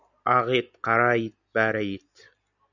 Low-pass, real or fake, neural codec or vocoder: 7.2 kHz; real; none